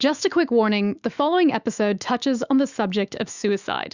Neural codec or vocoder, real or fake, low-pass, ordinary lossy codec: autoencoder, 48 kHz, 128 numbers a frame, DAC-VAE, trained on Japanese speech; fake; 7.2 kHz; Opus, 64 kbps